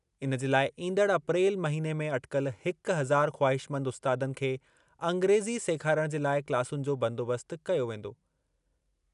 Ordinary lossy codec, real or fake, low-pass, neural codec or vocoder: none; real; 10.8 kHz; none